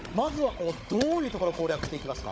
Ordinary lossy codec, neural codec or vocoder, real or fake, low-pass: none; codec, 16 kHz, 16 kbps, FunCodec, trained on Chinese and English, 50 frames a second; fake; none